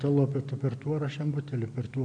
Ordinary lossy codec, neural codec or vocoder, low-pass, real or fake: AAC, 48 kbps; none; 9.9 kHz; real